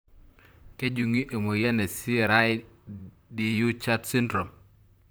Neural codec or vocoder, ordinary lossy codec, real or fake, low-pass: vocoder, 44.1 kHz, 128 mel bands, Pupu-Vocoder; none; fake; none